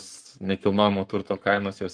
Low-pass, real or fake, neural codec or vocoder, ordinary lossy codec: 9.9 kHz; fake; codec, 44.1 kHz, 7.8 kbps, Pupu-Codec; Opus, 24 kbps